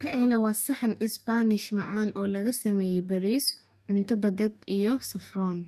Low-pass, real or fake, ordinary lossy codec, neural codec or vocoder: 14.4 kHz; fake; none; codec, 44.1 kHz, 2.6 kbps, DAC